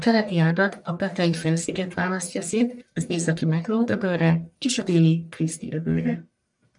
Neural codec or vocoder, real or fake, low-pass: codec, 44.1 kHz, 1.7 kbps, Pupu-Codec; fake; 10.8 kHz